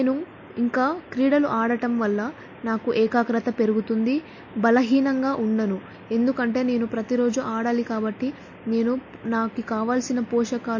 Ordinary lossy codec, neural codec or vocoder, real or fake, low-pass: MP3, 32 kbps; none; real; 7.2 kHz